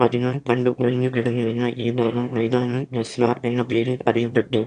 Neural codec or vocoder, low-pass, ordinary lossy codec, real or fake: autoencoder, 22.05 kHz, a latent of 192 numbers a frame, VITS, trained on one speaker; 9.9 kHz; AAC, 96 kbps; fake